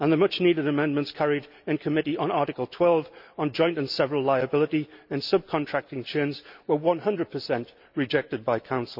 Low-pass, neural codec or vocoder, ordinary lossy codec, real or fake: 5.4 kHz; none; none; real